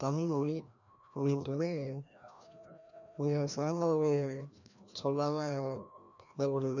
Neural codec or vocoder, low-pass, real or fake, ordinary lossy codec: codec, 16 kHz, 1 kbps, FreqCodec, larger model; 7.2 kHz; fake; none